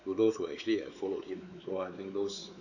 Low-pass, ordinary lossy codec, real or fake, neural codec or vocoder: 7.2 kHz; none; fake; codec, 16 kHz, 4 kbps, X-Codec, WavLM features, trained on Multilingual LibriSpeech